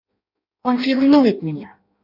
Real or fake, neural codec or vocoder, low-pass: fake; codec, 16 kHz in and 24 kHz out, 0.6 kbps, FireRedTTS-2 codec; 5.4 kHz